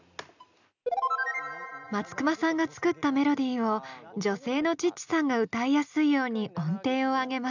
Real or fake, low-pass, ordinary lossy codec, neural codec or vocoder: real; 7.2 kHz; none; none